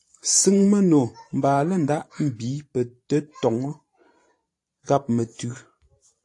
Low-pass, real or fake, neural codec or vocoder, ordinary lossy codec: 10.8 kHz; fake; vocoder, 44.1 kHz, 128 mel bands every 512 samples, BigVGAN v2; AAC, 48 kbps